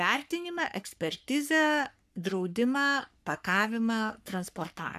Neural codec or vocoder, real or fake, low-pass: codec, 44.1 kHz, 3.4 kbps, Pupu-Codec; fake; 14.4 kHz